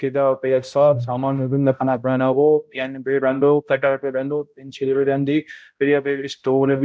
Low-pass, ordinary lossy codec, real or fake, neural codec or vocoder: none; none; fake; codec, 16 kHz, 0.5 kbps, X-Codec, HuBERT features, trained on balanced general audio